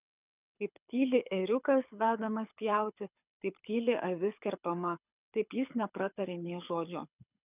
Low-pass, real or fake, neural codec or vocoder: 3.6 kHz; fake; codec, 24 kHz, 6 kbps, HILCodec